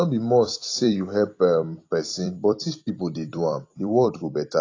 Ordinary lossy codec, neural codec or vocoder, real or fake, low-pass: AAC, 32 kbps; vocoder, 44.1 kHz, 128 mel bands every 256 samples, BigVGAN v2; fake; 7.2 kHz